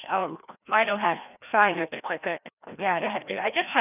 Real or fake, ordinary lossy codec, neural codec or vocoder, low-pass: fake; none; codec, 16 kHz, 1 kbps, FreqCodec, larger model; 3.6 kHz